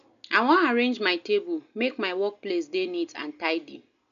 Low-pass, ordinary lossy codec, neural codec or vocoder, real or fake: 7.2 kHz; none; none; real